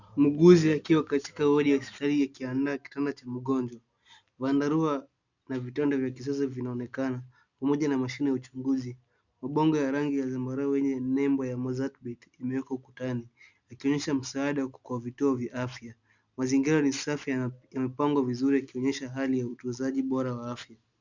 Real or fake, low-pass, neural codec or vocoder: real; 7.2 kHz; none